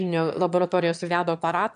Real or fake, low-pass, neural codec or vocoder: fake; 9.9 kHz; autoencoder, 22.05 kHz, a latent of 192 numbers a frame, VITS, trained on one speaker